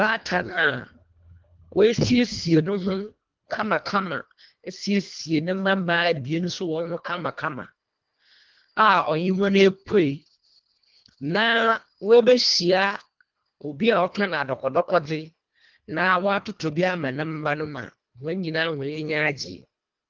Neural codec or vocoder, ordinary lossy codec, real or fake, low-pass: codec, 24 kHz, 1.5 kbps, HILCodec; Opus, 32 kbps; fake; 7.2 kHz